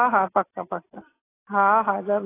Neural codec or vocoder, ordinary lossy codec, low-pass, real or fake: none; AAC, 16 kbps; 3.6 kHz; real